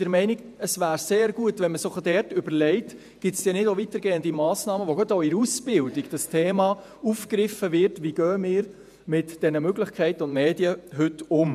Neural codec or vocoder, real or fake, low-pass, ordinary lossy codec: vocoder, 48 kHz, 128 mel bands, Vocos; fake; 14.4 kHz; none